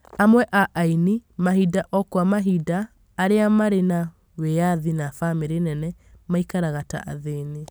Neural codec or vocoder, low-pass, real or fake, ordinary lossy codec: none; none; real; none